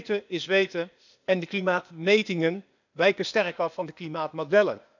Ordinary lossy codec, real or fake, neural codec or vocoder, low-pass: none; fake; codec, 16 kHz, 0.8 kbps, ZipCodec; 7.2 kHz